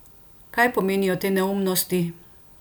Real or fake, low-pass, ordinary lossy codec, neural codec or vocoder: real; none; none; none